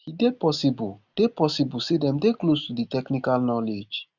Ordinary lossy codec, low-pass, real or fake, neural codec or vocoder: none; 7.2 kHz; real; none